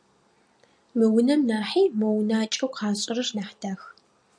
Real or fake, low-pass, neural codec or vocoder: real; 9.9 kHz; none